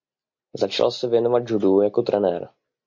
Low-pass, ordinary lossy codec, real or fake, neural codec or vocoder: 7.2 kHz; MP3, 48 kbps; real; none